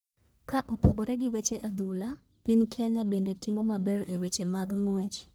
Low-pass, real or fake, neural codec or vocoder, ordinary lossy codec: none; fake; codec, 44.1 kHz, 1.7 kbps, Pupu-Codec; none